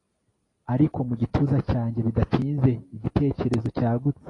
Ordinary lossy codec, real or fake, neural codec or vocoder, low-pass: AAC, 32 kbps; real; none; 10.8 kHz